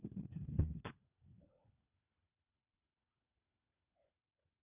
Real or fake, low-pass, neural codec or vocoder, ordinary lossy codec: fake; 3.6 kHz; codec, 16 kHz, 4 kbps, FunCodec, trained on LibriTTS, 50 frames a second; none